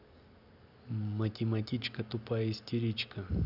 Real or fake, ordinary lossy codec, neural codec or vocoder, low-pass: real; none; none; 5.4 kHz